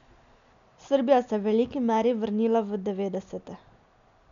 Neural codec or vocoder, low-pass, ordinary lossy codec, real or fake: none; 7.2 kHz; none; real